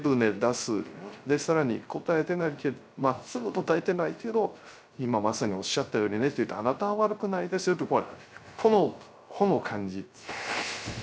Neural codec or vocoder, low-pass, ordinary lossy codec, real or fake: codec, 16 kHz, 0.3 kbps, FocalCodec; none; none; fake